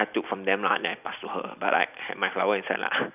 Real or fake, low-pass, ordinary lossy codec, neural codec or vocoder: real; 3.6 kHz; none; none